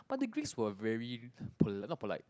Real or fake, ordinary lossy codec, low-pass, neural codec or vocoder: real; none; none; none